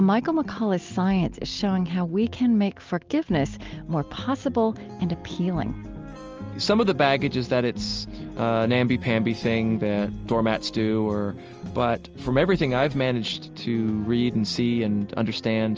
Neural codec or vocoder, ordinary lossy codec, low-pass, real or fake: none; Opus, 24 kbps; 7.2 kHz; real